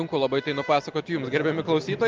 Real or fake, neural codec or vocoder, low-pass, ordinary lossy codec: real; none; 7.2 kHz; Opus, 24 kbps